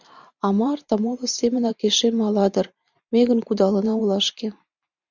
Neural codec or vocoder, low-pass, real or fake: vocoder, 44.1 kHz, 128 mel bands every 512 samples, BigVGAN v2; 7.2 kHz; fake